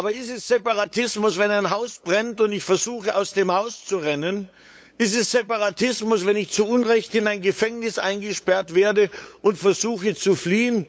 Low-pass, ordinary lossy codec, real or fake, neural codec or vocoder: none; none; fake; codec, 16 kHz, 8 kbps, FunCodec, trained on LibriTTS, 25 frames a second